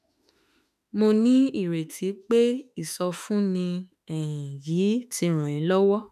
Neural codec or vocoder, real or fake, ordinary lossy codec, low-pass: autoencoder, 48 kHz, 32 numbers a frame, DAC-VAE, trained on Japanese speech; fake; none; 14.4 kHz